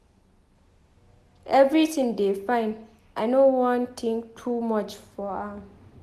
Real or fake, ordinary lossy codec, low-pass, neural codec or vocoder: real; none; 14.4 kHz; none